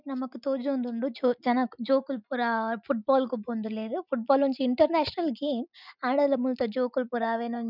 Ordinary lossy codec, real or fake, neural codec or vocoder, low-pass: none; real; none; 5.4 kHz